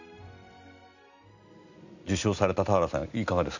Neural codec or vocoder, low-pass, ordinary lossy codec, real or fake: none; 7.2 kHz; none; real